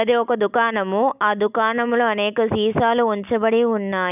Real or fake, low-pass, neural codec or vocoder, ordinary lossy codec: real; 3.6 kHz; none; none